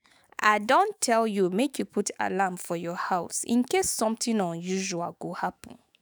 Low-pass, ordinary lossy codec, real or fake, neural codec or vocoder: none; none; fake; autoencoder, 48 kHz, 128 numbers a frame, DAC-VAE, trained on Japanese speech